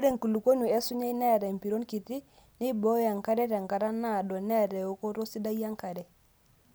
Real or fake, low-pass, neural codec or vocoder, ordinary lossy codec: real; none; none; none